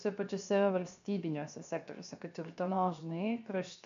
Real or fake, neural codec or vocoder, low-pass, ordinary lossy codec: fake; codec, 16 kHz, 0.7 kbps, FocalCodec; 7.2 kHz; MP3, 64 kbps